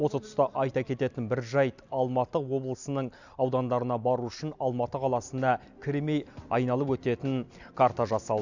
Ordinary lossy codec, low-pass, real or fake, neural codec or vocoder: none; 7.2 kHz; real; none